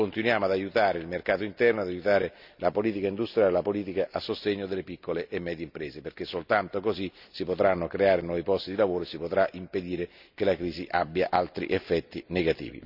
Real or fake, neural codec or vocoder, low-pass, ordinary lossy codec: real; none; 5.4 kHz; none